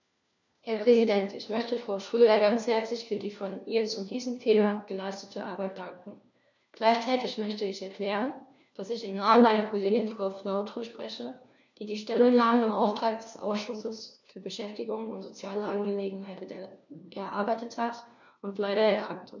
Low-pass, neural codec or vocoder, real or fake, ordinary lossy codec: 7.2 kHz; codec, 16 kHz, 1 kbps, FunCodec, trained on LibriTTS, 50 frames a second; fake; none